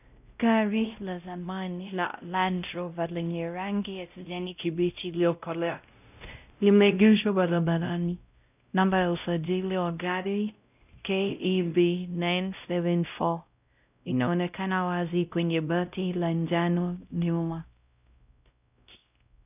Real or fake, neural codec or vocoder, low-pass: fake; codec, 16 kHz, 0.5 kbps, X-Codec, WavLM features, trained on Multilingual LibriSpeech; 3.6 kHz